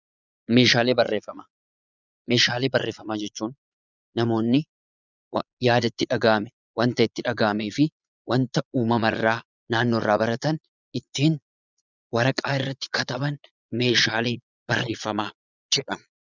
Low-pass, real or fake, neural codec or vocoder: 7.2 kHz; fake; vocoder, 22.05 kHz, 80 mel bands, Vocos